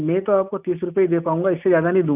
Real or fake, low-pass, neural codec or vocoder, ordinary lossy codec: real; 3.6 kHz; none; none